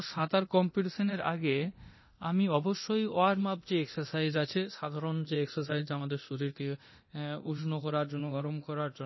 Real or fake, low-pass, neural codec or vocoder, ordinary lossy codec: fake; 7.2 kHz; codec, 24 kHz, 0.9 kbps, DualCodec; MP3, 24 kbps